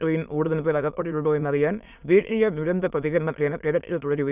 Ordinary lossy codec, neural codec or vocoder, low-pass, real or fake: none; autoencoder, 22.05 kHz, a latent of 192 numbers a frame, VITS, trained on many speakers; 3.6 kHz; fake